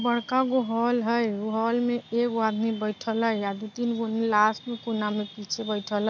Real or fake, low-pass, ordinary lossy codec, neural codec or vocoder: real; 7.2 kHz; none; none